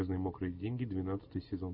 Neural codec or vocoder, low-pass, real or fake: none; 5.4 kHz; real